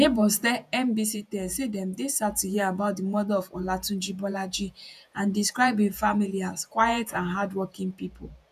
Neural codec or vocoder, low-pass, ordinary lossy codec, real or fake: vocoder, 48 kHz, 128 mel bands, Vocos; 14.4 kHz; none; fake